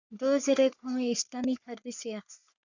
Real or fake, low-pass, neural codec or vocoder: fake; 7.2 kHz; codec, 44.1 kHz, 3.4 kbps, Pupu-Codec